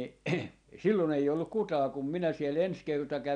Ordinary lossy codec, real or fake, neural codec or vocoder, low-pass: none; real; none; 9.9 kHz